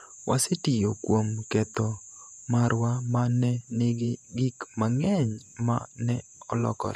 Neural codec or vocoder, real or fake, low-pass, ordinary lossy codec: vocoder, 48 kHz, 128 mel bands, Vocos; fake; 14.4 kHz; none